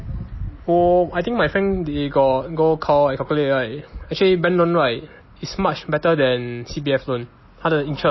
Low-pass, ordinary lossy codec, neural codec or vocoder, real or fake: 7.2 kHz; MP3, 24 kbps; none; real